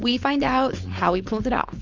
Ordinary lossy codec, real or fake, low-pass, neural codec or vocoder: Opus, 32 kbps; fake; 7.2 kHz; codec, 16 kHz, 4.8 kbps, FACodec